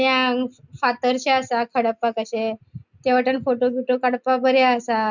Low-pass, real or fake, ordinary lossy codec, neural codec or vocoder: 7.2 kHz; real; none; none